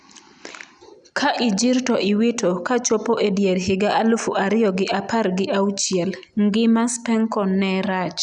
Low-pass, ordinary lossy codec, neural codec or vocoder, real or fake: 9.9 kHz; none; none; real